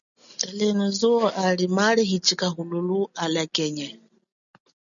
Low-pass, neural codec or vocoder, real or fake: 7.2 kHz; none; real